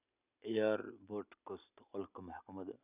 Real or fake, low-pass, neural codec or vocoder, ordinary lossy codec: real; 3.6 kHz; none; none